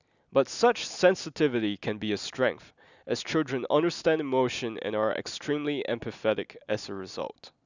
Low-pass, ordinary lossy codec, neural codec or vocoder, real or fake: 7.2 kHz; none; none; real